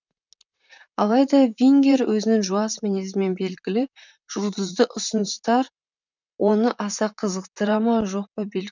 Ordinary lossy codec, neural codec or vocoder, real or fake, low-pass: none; vocoder, 44.1 kHz, 128 mel bands, Pupu-Vocoder; fake; 7.2 kHz